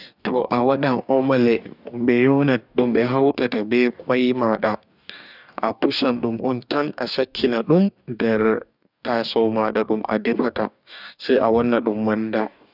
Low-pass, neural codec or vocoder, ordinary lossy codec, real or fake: 5.4 kHz; codec, 44.1 kHz, 2.6 kbps, DAC; none; fake